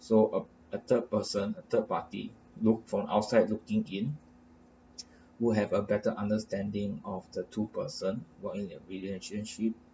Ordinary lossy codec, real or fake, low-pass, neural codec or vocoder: none; real; none; none